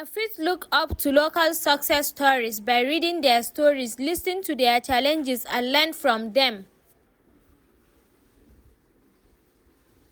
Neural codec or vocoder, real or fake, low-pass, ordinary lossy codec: none; real; none; none